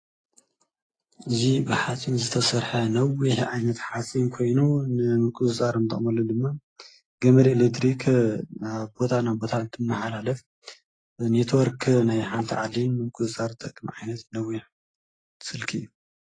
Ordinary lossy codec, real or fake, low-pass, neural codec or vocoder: AAC, 32 kbps; real; 9.9 kHz; none